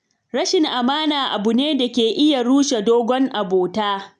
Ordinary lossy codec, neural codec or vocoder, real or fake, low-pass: none; none; real; 9.9 kHz